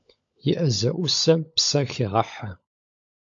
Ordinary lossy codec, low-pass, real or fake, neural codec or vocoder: AAC, 64 kbps; 7.2 kHz; fake; codec, 16 kHz, 16 kbps, FunCodec, trained on LibriTTS, 50 frames a second